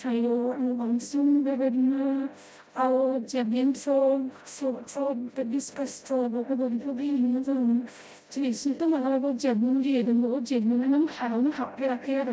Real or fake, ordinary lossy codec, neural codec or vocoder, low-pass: fake; none; codec, 16 kHz, 0.5 kbps, FreqCodec, smaller model; none